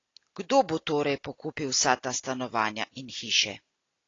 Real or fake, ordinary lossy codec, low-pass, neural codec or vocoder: real; AAC, 32 kbps; 7.2 kHz; none